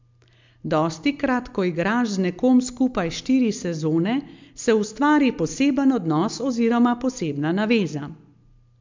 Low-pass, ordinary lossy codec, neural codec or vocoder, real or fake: 7.2 kHz; MP3, 64 kbps; none; real